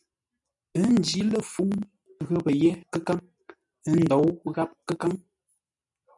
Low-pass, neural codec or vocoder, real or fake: 10.8 kHz; none; real